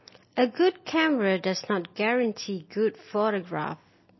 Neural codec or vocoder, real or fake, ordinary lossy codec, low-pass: none; real; MP3, 24 kbps; 7.2 kHz